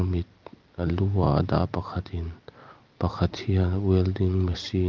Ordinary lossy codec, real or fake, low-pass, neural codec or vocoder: Opus, 32 kbps; real; 7.2 kHz; none